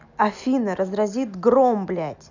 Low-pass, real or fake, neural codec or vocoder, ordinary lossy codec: 7.2 kHz; real; none; none